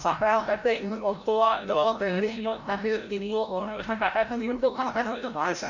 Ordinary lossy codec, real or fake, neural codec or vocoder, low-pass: none; fake; codec, 16 kHz, 0.5 kbps, FreqCodec, larger model; 7.2 kHz